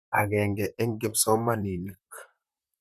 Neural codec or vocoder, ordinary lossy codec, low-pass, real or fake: vocoder, 44.1 kHz, 128 mel bands, Pupu-Vocoder; none; 14.4 kHz; fake